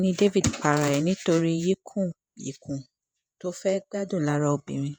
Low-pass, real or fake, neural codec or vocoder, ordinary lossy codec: none; fake; vocoder, 48 kHz, 128 mel bands, Vocos; none